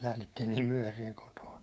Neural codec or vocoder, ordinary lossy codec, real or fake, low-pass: codec, 16 kHz, 6 kbps, DAC; none; fake; none